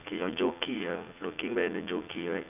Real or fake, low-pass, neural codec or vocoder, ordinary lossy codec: fake; 3.6 kHz; vocoder, 44.1 kHz, 80 mel bands, Vocos; none